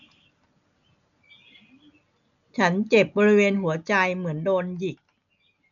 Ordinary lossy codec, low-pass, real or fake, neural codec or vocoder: none; 7.2 kHz; real; none